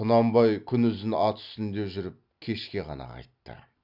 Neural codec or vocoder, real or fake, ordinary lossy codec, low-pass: none; real; Opus, 64 kbps; 5.4 kHz